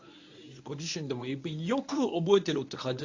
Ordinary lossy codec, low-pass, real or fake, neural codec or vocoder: AAC, 48 kbps; 7.2 kHz; fake; codec, 24 kHz, 0.9 kbps, WavTokenizer, medium speech release version 1